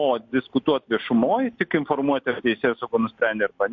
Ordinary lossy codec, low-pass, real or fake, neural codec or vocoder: MP3, 48 kbps; 7.2 kHz; real; none